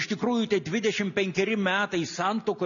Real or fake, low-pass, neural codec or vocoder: real; 7.2 kHz; none